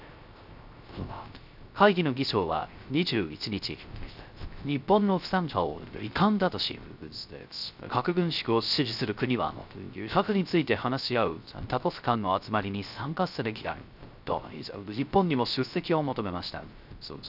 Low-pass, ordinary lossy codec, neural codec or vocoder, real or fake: 5.4 kHz; none; codec, 16 kHz, 0.3 kbps, FocalCodec; fake